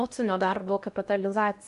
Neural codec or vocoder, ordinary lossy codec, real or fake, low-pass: codec, 16 kHz in and 24 kHz out, 0.8 kbps, FocalCodec, streaming, 65536 codes; MP3, 64 kbps; fake; 10.8 kHz